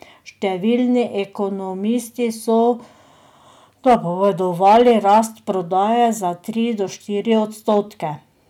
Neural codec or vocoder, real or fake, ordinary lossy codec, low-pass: none; real; none; 19.8 kHz